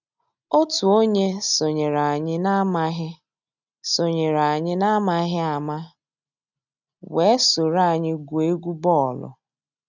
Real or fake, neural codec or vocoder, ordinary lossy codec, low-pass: real; none; none; 7.2 kHz